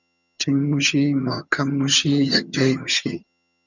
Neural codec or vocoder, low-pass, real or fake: vocoder, 22.05 kHz, 80 mel bands, HiFi-GAN; 7.2 kHz; fake